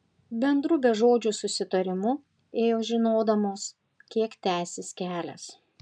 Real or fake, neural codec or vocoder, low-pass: real; none; 9.9 kHz